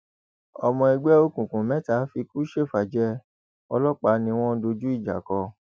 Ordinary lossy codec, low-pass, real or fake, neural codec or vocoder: none; none; real; none